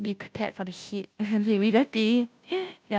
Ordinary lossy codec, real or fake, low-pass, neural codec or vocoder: none; fake; none; codec, 16 kHz, 0.5 kbps, FunCodec, trained on Chinese and English, 25 frames a second